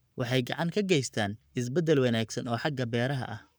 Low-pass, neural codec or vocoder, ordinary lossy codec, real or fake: none; codec, 44.1 kHz, 7.8 kbps, Pupu-Codec; none; fake